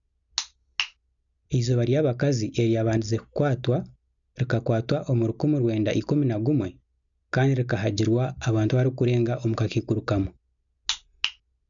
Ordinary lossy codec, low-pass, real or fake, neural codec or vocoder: none; 7.2 kHz; real; none